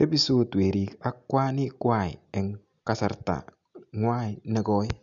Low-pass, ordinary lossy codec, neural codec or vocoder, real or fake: 7.2 kHz; MP3, 96 kbps; none; real